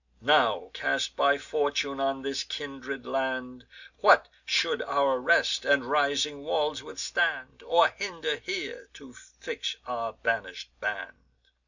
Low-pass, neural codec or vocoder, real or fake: 7.2 kHz; none; real